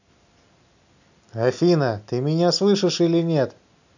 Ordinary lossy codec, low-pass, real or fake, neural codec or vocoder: none; 7.2 kHz; real; none